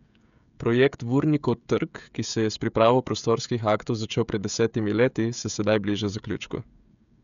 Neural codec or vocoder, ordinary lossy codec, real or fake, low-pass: codec, 16 kHz, 16 kbps, FreqCodec, smaller model; none; fake; 7.2 kHz